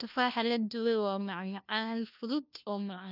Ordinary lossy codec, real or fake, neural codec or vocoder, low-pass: none; fake; codec, 16 kHz, 1 kbps, FunCodec, trained on LibriTTS, 50 frames a second; 5.4 kHz